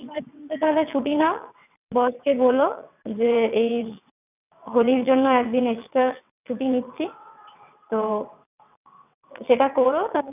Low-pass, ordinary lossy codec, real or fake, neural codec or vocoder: 3.6 kHz; none; fake; vocoder, 22.05 kHz, 80 mel bands, WaveNeXt